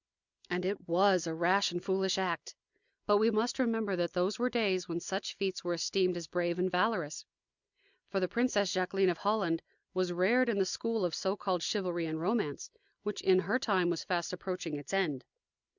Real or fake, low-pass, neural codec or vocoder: real; 7.2 kHz; none